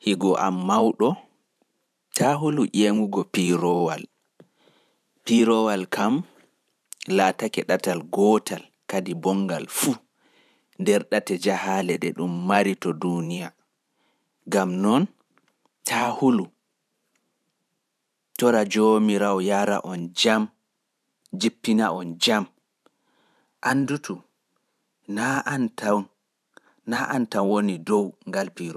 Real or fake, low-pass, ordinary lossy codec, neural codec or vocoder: fake; 14.4 kHz; none; vocoder, 44.1 kHz, 128 mel bands every 512 samples, BigVGAN v2